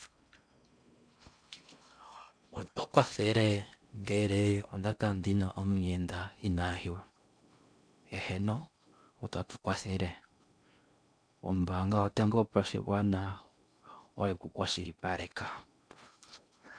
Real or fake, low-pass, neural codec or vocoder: fake; 9.9 kHz; codec, 16 kHz in and 24 kHz out, 0.6 kbps, FocalCodec, streaming, 4096 codes